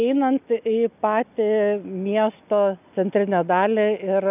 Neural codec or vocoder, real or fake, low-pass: none; real; 3.6 kHz